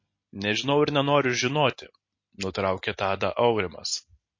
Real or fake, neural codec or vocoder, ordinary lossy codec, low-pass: real; none; MP3, 32 kbps; 7.2 kHz